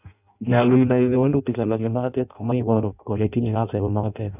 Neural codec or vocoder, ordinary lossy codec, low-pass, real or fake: codec, 16 kHz in and 24 kHz out, 0.6 kbps, FireRedTTS-2 codec; none; 3.6 kHz; fake